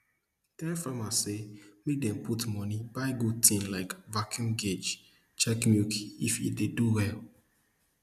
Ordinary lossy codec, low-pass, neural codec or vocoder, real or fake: none; 14.4 kHz; none; real